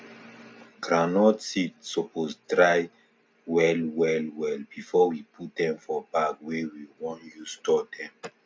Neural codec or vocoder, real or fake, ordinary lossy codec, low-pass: none; real; none; none